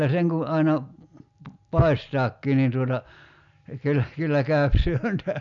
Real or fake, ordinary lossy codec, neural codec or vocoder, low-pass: real; none; none; 7.2 kHz